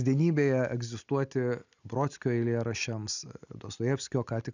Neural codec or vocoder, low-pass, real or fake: none; 7.2 kHz; real